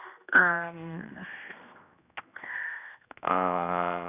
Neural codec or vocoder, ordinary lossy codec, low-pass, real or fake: codec, 16 kHz, 1 kbps, X-Codec, HuBERT features, trained on general audio; none; 3.6 kHz; fake